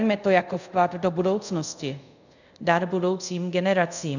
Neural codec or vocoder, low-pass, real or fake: codec, 24 kHz, 0.5 kbps, DualCodec; 7.2 kHz; fake